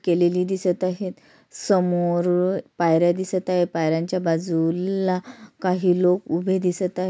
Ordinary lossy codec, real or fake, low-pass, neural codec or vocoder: none; real; none; none